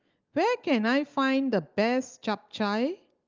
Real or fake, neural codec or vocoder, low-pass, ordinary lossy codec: real; none; 7.2 kHz; Opus, 24 kbps